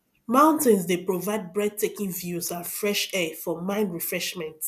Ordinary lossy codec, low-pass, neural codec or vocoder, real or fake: none; 14.4 kHz; none; real